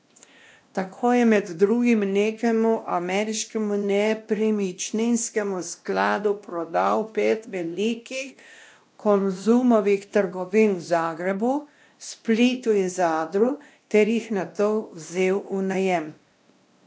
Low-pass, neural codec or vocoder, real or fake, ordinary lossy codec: none; codec, 16 kHz, 1 kbps, X-Codec, WavLM features, trained on Multilingual LibriSpeech; fake; none